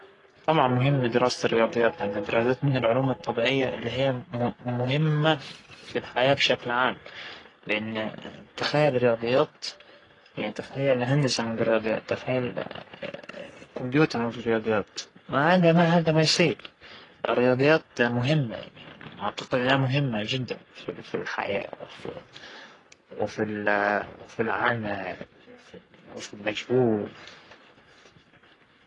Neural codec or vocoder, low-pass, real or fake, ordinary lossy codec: codec, 44.1 kHz, 3.4 kbps, Pupu-Codec; 10.8 kHz; fake; AAC, 32 kbps